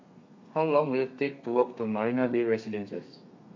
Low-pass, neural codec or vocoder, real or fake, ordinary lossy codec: 7.2 kHz; codec, 32 kHz, 1.9 kbps, SNAC; fake; MP3, 48 kbps